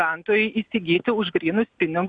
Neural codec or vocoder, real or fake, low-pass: none; real; 9.9 kHz